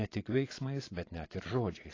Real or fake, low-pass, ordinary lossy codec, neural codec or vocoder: fake; 7.2 kHz; AAC, 32 kbps; vocoder, 24 kHz, 100 mel bands, Vocos